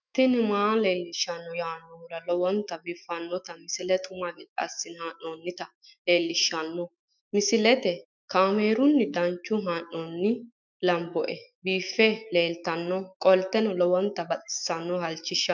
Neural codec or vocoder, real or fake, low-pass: autoencoder, 48 kHz, 128 numbers a frame, DAC-VAE, trained on Japanese speech; fake; 7.2 kHz